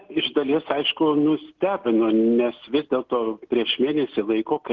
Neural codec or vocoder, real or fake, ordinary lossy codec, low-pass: none; real; Opus, 16 kbps; 7.2 kHz